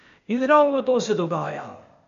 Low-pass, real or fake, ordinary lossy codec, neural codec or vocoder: 7.2 kHz; fake; none; codec, 16 kHz, 0.8 kbps, ZipCodec